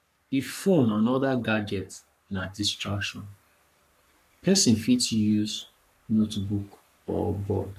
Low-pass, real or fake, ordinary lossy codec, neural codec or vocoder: 14.4 kHz; fake; none; codec, 44.1 kHz, 3.4 kbps, Pupu-Codec